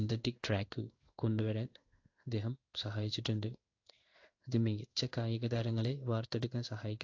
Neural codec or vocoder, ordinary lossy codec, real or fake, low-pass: codec, 16 kHz in and 24 kHz out, 1 kbps, XY-Tokenizer; AAC, 48 kbps; fake; 7.2 kHz